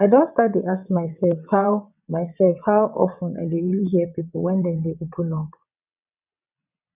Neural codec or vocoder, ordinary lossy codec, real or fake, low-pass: vocoder, 22.05 kHz, 80 mel bands, WaveNeXt; none; fake; 3.6 kHz